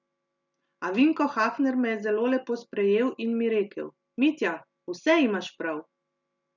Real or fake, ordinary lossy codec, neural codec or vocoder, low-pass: real; none; none; 7.2 kHz